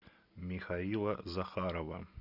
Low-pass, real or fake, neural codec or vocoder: 5.4 kHz; real; none